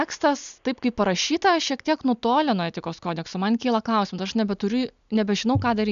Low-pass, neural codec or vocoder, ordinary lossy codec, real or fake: 7.2 kHz; none; MP3, 96 kbps; real